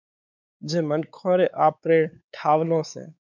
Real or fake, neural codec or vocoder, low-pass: fake; codec, 16 kHz, 4 kbps, X-Codec, WavLM features, trained on Multilingual LibriSpeech; 7.2 kHz